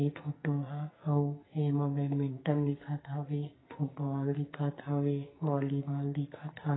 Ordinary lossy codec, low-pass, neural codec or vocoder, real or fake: AAC, 16 kbps; 7.2 kHz; codec, 44.1 kHz, 2.6 kbps, SNAC; fake